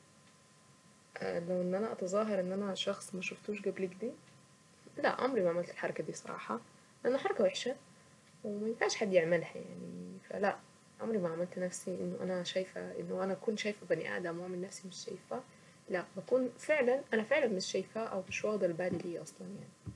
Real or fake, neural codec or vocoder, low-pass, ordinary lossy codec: real; none; none; none